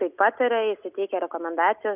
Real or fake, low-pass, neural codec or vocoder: real; 3.6 kHz; none